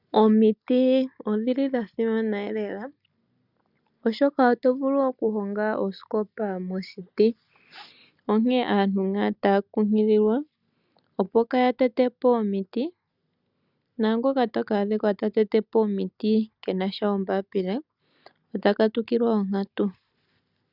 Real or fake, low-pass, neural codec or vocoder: real; 5.4 kHz; none